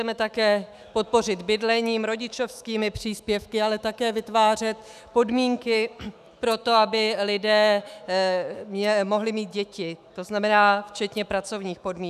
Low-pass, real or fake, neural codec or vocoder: 14.4 kHz; fake; autoencoder, 48 kHz, 128 numbers a frame, DAC-VAE, trained on Japanese speech